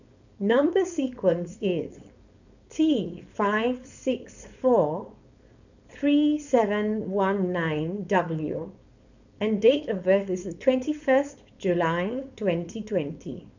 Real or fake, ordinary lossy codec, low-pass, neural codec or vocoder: fake; none; 7.2 kHz; codec, 16 kHz, 4.8 kbps, FACodec